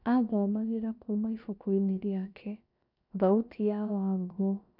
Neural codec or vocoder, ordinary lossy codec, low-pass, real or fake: codec, 16 kHz, 0.7 kbps, FocalCodec; none; 5.4 kHz; fake